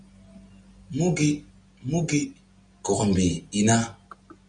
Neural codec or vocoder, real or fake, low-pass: none; real; 9.9 kHz